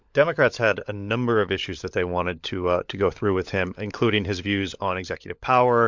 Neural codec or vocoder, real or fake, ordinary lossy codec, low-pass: codec, 16 kHz, 16 kbps, FunCodec, trained on LibriTTS, 50 frames a second; fake; MP3, 64 kbps; 7.2 kHz